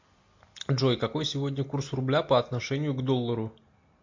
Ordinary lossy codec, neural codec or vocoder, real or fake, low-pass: MP3, 48 kbps; none; real; 7.2 kHz